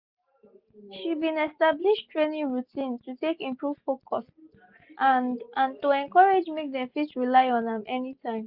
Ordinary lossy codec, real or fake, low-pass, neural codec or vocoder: Opus, 64 kbps; real; 5.4 kHz; none